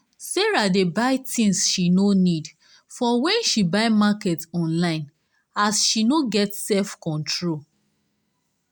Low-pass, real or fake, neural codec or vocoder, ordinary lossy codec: none; real; none; none